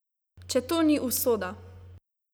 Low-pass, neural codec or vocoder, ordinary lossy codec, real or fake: none; none; none; real